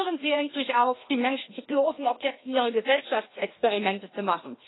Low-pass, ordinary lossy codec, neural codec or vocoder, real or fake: 7.2 kHz; AAC, 16 kbps; codec, 16 kHz, 1 kbps, FreqCodec, larger model; fake